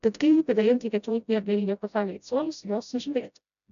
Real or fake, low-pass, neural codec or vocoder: fake; 7.2 kHz; codec, 16 kHz, 0.5 kbps, FreqCodec, smaller model